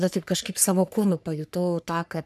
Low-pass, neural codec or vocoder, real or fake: 14.4 kHz; codec, 44.1 kHz, 3.4 kbps, Pupu-Codec; fake